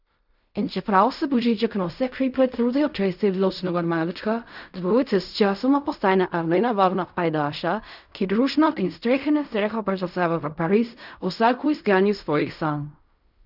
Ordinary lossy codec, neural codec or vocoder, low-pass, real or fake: none; codec, 16 kHz in and 24 kHz out, 0.4 kbps, LongCat-Audio-Codec, fine tuned four codebook decoder; 5.4 kHz; fake